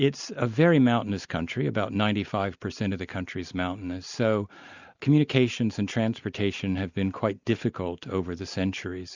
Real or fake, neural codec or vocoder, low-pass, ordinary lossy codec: real; none; 7.2 kHz; Opus, 64 kbps